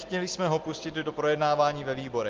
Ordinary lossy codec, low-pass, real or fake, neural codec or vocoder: Opus, 16 kbps; 7.2 kHz; real; none